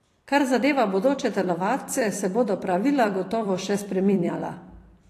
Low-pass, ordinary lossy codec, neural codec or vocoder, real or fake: 14.4 kHz; AAC, 48 kbps; vocoder, 44.1 kHz, 128 mel bands every 256 samples, BigVGAN v2; fake